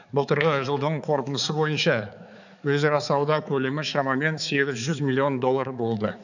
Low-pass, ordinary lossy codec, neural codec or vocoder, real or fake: 7.2 kHz; none; codec, 16 kHz, 4 kbps, X-Codec, HuBERT features, trained on balanced general audio; fake